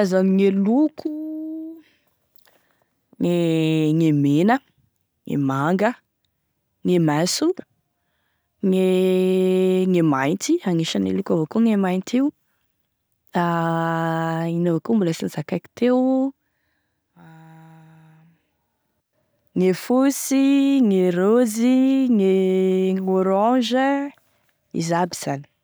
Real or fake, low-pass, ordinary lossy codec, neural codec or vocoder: real; none; none; none